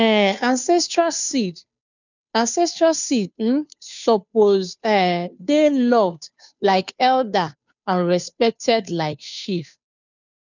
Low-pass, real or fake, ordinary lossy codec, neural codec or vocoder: 7.2 kHz; fake; none; codec, 16 kHz, 2 kbps, FunCodec, trained on Chinese and English, 25 frames a second